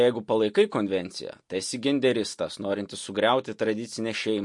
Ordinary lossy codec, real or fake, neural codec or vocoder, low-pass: MP3, 48 kbps; real; none; 10.8 kHz